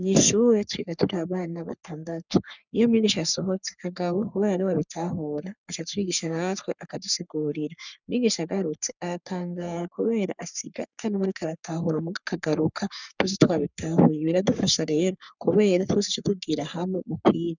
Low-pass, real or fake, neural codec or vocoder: 7.2 kHz; fake; codec, 44.1 kHz, 3.4 kbps, Pupu-Codec